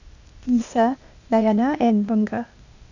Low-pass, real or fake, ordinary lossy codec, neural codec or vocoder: 7.2 kHz; fake; none; codec, 16 kHz, 0.8 kbps, ZipCodec